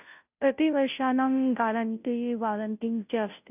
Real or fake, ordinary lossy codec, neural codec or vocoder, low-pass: fake; none; codec, 16 kHz, 0.5 kbps, FunCodec, trained on Chinese and English, 25 frames a second; 3.6 kHz